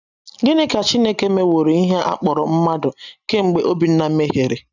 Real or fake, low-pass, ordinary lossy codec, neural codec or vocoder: real; 7.2 kHz; none; none